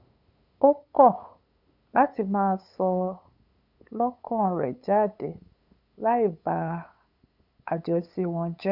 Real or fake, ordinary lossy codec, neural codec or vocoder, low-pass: fake; none; codec, 16 kHz, 8 kbps, FunCodec, trained on LibriTTS, 25 frames a second; 5.4 kHz